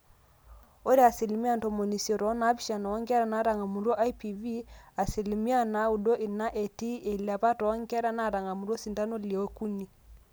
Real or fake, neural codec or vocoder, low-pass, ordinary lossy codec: real; none; none; none